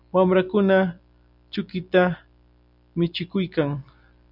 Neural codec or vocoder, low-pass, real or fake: none; 5.4 kHz; real